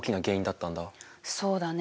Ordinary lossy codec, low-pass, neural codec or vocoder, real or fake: none; none; none; real